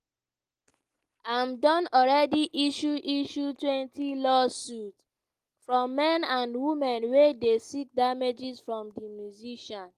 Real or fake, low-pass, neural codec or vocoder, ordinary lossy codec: real; 14.4 kHz; none; Opus, 24 kbps